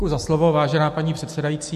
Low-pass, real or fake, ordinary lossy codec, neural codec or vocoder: 14.4 kHz; real; MP3, 64 kbps; none